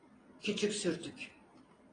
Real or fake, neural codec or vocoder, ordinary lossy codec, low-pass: real; none; AAC, 32 kbps; 9.9 kHz